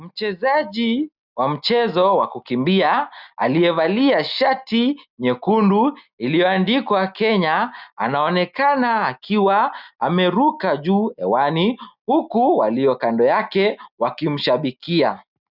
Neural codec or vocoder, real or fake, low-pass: none; real; 5.4 kHz